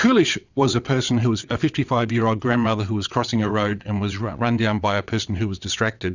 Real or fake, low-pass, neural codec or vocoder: fake; 7.2 kHz; vocoder, 22.05 kHz, 80 mel bands, WaveNeXt